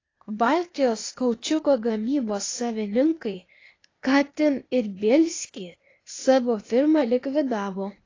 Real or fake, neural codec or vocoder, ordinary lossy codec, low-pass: fake; codec, 16 kHz, 0.8 kbps, ZipCodec; AAC, 32 kbps; 7.2 kHz